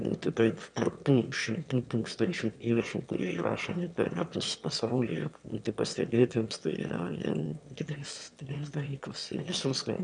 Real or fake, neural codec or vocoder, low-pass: fake; autoencoder, 22.05 kHz, a latent of 192 numbers a frame, VITS, trained on one speaker; 9.9 kHz